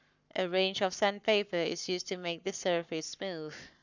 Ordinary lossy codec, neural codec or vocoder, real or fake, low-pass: none; codec, 44.1 kHz, 7.8 kbps, Pupu-Codec; fake; 7.2 kHz